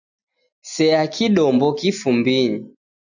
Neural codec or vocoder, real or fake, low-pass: none; real; 7.2 kHz